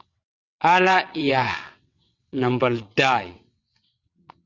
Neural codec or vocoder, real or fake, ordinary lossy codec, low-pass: vocoder, 22.05 kHz, 80 mel bands, WaveNeXt; fake; Opus, 64 kbps; 7.2 kHz